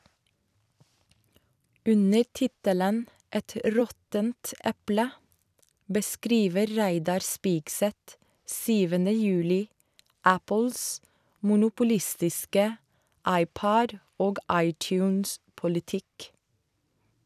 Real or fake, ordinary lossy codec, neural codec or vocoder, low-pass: fake; none; vocoder, 44.1 kHz, 128 mel bands every 256 samples, BigVGAN v2; 14.4 kHz